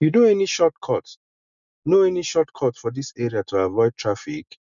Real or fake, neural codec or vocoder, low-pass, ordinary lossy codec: real; none; 7.2 kHz; none